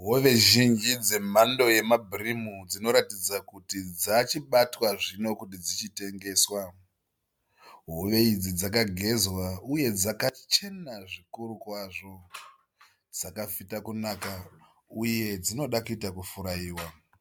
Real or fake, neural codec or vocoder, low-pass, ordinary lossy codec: real; none; 19.8 kHz; MP3, 96 kbps